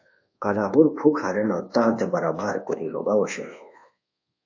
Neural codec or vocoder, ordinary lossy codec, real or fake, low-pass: codec, 24 kHz, 1.2 kbps, DualCodec; AAC, 48 kbps; fake; 7.2 kHz